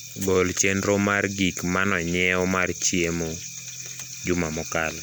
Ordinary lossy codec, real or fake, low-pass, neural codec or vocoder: none; real; none; none